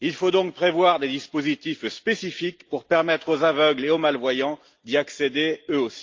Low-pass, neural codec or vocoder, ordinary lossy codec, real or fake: 7.2 kHz; none; Opus, 24 kbps; real